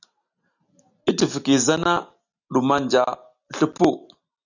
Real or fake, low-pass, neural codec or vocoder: real; 7.2 kHz; none